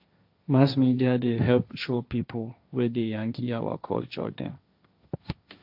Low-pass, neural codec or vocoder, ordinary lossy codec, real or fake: 5.4 kHz; codec, 16 kHz, 1.1 kbps, Voila-Tokenizer; AAC, 48 kbps; fake